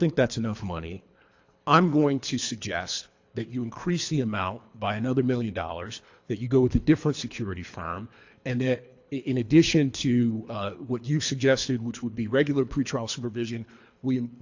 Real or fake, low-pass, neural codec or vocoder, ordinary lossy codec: fake; 7.2 kHz; codec, 24 kHz, 3 kbps, HILCodec; AAC, 48 kbps